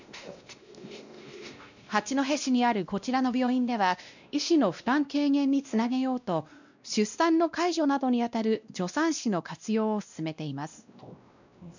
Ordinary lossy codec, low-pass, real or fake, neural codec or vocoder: none; 7.2 kHz; fake; codec, 16 kHz, 1 kbps, X-Codec, WavLM features, trained on Multilingual LibriSpeech